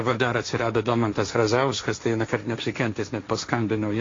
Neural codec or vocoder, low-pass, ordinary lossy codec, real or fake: codec, 16 kHz, 1.1 kbps, Voila-Tokenizer; 7.2 kHz; AAC, 32 kbps; fake